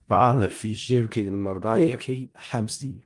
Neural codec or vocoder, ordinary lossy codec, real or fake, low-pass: codec, 16 kHz in and 24 kHz out, 0.4 kbps, LongCat-Audio-Codec, four codebook decoder; Opus, 24 kbps; fake; 10.8 kHz